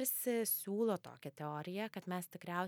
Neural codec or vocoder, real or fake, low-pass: none; real; 19.8 kHz